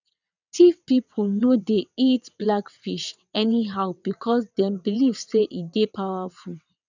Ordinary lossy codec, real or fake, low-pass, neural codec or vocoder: none; fake; 7.2 kHz; vocoder, 22.05 kHz, 80 mel bands, WaveNeXt